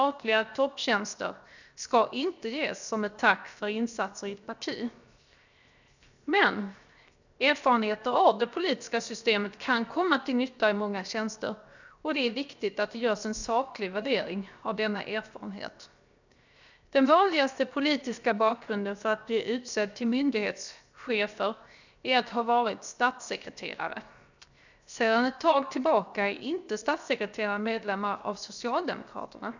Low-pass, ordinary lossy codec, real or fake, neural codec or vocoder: 7.2 kHz; none; fake; codec, 16 kHz, 0.7 kbps, FocalCodec